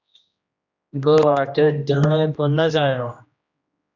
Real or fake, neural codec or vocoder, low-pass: fake; codec, 16 kHz, 1 kbps, X-Codec, HuBERT features, trained on general audio; 7.2 kHz